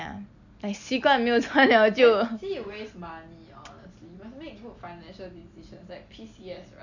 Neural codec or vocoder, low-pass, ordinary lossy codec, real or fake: none; 7.2 kHz; none; real